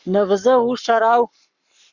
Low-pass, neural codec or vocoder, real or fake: 7.2 kHz; codec, 44.1 kHz, 7.8 kbps, Pupu-Codec; fake